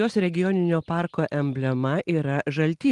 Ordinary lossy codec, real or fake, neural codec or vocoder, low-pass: Opus, 24 kbps; real; none; 10.8 kHz